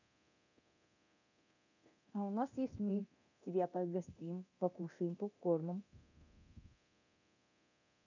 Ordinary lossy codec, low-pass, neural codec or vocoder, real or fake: none; 7.2 kHz; codec, 24 kHz, 0.9 kbps, DualCodec; fake